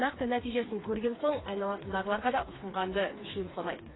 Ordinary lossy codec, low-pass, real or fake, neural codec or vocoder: AAC, 16 kbps; 7.2 kHz; fake; codec, 16 kHz, 4 kbps, FreqCodec, smaller model